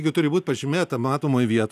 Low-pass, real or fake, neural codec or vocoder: 14.4 kHz; real; none